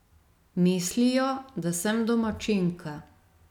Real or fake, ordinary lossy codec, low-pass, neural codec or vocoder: real; none; 19.8 kHz; none